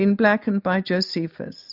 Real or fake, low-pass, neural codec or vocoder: real; 5.4 kHz; none